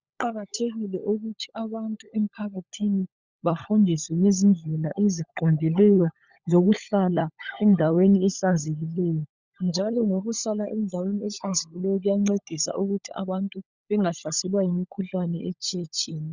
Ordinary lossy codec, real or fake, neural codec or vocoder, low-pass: Opus, 64 kbps; fake; codec, 16 kHz, 16 kbps, FunCodec, trained on LibriTTS, 50 frames a second; 7.2 kHz